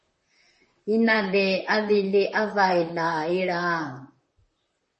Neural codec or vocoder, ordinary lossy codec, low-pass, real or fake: vocoder, 44.1 kHz, 128 mel bands, Pupu-Vocoder; MP3, 32 kbps; 10.8 kHz; fake